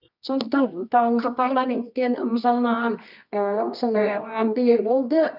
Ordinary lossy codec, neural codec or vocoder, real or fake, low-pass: none; codec, 24 kHz, 0.9 kbps, WavTokenizer, medium music audio release; fake; 5.4 kHz